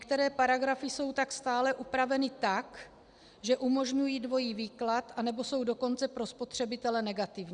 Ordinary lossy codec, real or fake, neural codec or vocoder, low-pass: Opus, 64 kbps; real; none; 9.9 kHz